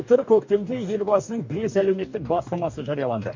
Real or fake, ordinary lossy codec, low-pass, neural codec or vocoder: fake; MP3, 48 kbps; 7.2 kHz; codec, 44.1 kHz, 2.6 kbps, DAC